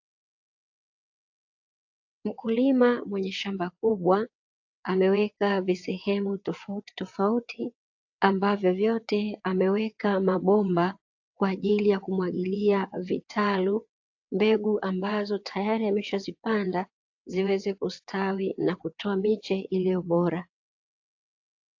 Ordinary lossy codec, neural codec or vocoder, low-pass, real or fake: AAC, 48 kbps; vocoder, 22.05 kHz, 80 mel bands, WaveNeXt; 7.2 kHz; fake